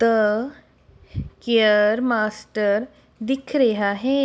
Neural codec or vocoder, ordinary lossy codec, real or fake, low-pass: none; none; real; none